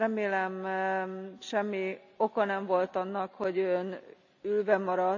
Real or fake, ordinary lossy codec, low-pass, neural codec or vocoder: real; none; 7.2 kHz; none